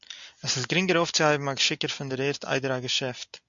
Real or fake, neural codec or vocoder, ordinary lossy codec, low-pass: real; none; MP3, 64 kbps; 7.2 kHz